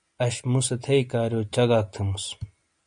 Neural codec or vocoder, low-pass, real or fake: none; 9.9 kHz; real